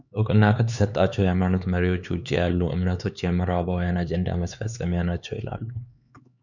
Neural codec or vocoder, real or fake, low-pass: codec, 16 kHz, 4 kbps, X-Codec, HuBERT features, trained on LibriSpeech; fake; 7.2 kHz